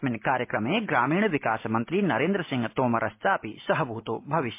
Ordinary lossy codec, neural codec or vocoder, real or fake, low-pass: MP3, 24 kbps; none; real; 3.6 kHz